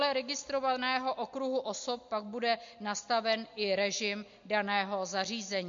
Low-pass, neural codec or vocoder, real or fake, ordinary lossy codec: 7.2 kHz; none; real; MP3, 48 kbps